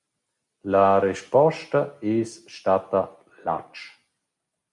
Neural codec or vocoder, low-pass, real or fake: none; 10.8 kHz; real